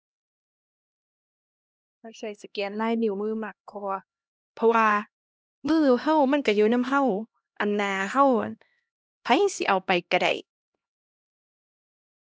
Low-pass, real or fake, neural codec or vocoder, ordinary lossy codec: none; fake; codec, 16 kHz, 1 kbps, X-Codec, HuBERT features, trained on LibriSpeech; none